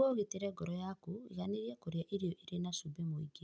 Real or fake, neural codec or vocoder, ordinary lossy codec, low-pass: real; none; none; none